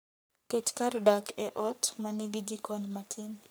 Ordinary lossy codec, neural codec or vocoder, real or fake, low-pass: none; codec, 44.1 kHz, 3.4 kbps, Pupu-Codec; fake; none